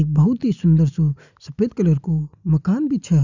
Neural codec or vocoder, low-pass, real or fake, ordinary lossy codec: none; 7.2 kHz; real; none